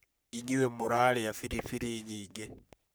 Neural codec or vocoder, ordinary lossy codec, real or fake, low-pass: codec, 44.1 kHz, 3.4 kbps, Pupu-Codec; none; fake; none